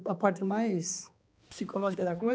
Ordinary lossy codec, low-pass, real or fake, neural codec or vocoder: none; none; fake; codec, 16 kHz, 2 kbps, X-Codec, HuBERT features, trained on balanced general audio